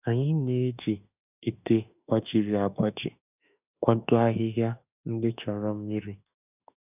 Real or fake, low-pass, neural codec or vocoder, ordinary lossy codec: fake; 3.6 kHz; autoencoder, 48 kHz, 32 numbers a frame, DAC-VAE, trained on Japanese speech; none